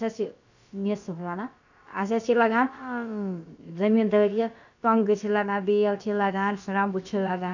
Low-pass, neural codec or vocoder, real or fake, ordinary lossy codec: 7.2 kHz; codec, 16 kHz, about 1 kbps, DyCAST, with the encoder's durations; fake; none